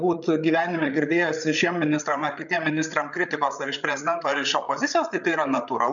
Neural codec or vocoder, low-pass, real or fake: codec, 16 kHz, 8 kbps, FreqCodec, larger model; 7.2 kHz; fake